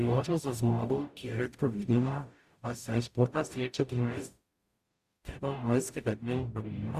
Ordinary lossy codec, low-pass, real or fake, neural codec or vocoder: Opus, 64 kbps; 14.4 kHz; fake; codec, 44.1 kHz, 0.9 kbps, DAC